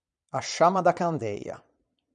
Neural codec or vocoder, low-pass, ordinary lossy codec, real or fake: vocoder, 22.05 kHz, 80 mel bands, Vocos; 9.9 kHz; MP3, 96 kbps; fake